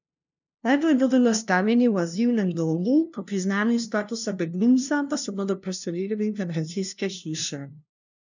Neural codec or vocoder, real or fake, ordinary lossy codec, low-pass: codec, 16 kHz, 0.5 kbps, FunCodec, trained on LibriTTS, 25 frames a second; fake; none; 7.2 kHz